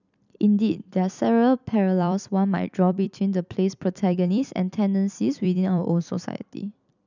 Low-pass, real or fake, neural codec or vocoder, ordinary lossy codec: 7.2 kHz; fake; vocoder, 44.1 kHz, 128 mel bands every 256 samples, BigVGAN v2; none